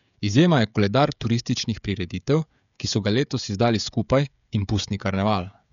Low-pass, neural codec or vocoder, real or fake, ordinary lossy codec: 7.2 kHz; codec, 16 kHz, 16 kbps, FreqCodec, smaller model; fake; none